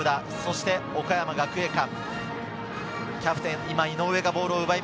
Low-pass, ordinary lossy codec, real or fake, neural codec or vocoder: none; none; real; none